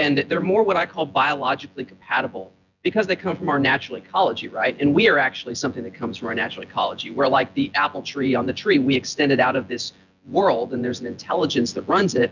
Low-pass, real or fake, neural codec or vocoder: 7.2 kHz; fake; vocoder, 24 kHz, 100 mel bands, Vocos